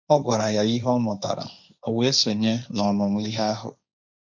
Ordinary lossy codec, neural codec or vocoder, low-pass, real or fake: none; codec, 16 kHz, 1.1 kbps, Voila-Tokenizer; 7.2 kHz; fake